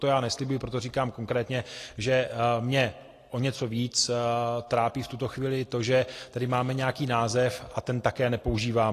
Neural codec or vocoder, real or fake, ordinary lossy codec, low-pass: none; real; AAC, 48 kbps; 14.4 kHz